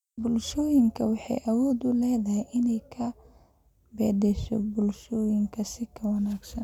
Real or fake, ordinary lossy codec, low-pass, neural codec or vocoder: real; none; 19.8 kHz; none